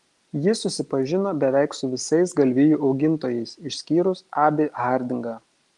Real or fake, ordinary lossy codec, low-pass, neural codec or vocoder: real; Opus, 24 kbps; 10.8 kHz; none